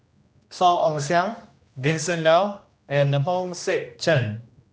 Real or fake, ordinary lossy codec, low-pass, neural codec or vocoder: fake; none; none; codec, 16 kHz, 1 kbps, X-Codec, HuBERT features, trained on general audio